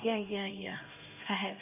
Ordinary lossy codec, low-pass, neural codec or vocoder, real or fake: none; 3.6 kHz; codec, 16 kHz, 1 kbps, X-Codec, HuBERT features, trained on LibriSpeech; fake